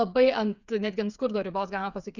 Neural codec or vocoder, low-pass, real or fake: codec, 16 kHz, 8 kbps, FreqCodec, smaller model; 7.2 kHz; fake